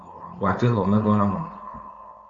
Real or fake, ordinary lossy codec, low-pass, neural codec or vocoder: fake; AAC, 48 kbps; 7.2 kHz; codec, 16 kHz, 4.8 kbps, FACodec